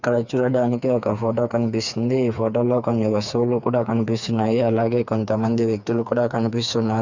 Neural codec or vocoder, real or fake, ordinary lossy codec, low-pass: codec, 16 kHz, 4 kbps, FreqCodec, smaller model; fake; none; 7.2 kHz